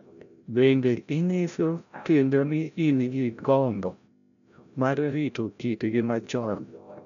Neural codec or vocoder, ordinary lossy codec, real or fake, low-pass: codec, 16 kHz, 0.5 kbps, FreqCodec, larger model; none; fake; 7.2 kHz